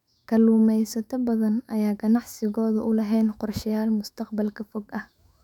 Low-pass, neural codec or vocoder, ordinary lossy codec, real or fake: 19.8 kHz; autoencoder, 48 kHz, 128 numbers a frame, DAC-VAE, trained on Japanese speech; none; fake